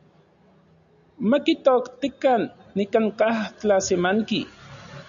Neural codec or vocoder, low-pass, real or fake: none; 7.2 kHz; real